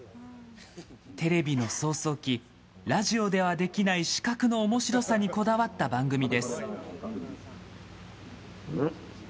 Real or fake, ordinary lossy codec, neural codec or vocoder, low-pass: real; none; none; none